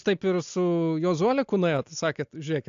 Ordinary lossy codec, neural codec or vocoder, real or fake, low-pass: AAC, 64 kbps; none; real; 7.2 kHz